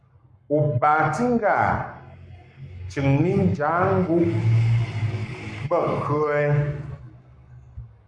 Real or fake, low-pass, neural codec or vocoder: fake; 9.9 kHz; codec, 44.1 kHz, 7.8 kbps, Pupu-Codec